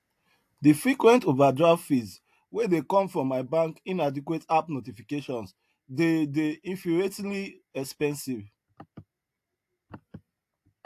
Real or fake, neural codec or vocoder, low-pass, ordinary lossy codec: real; none; 14.4 kHz; AAC, 64 kbps